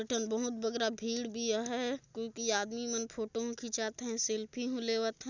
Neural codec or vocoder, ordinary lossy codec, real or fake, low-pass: none; none; real; 7.2 kHz